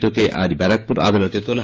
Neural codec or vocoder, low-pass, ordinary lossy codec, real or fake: codec, 16 kHz, 6 kbps, DAC; none; none; fake